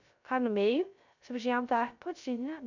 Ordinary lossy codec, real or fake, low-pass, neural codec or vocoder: none; fake; 7.2 kHz; codec, 16 kHz, 0.3 kbps, FocalCodec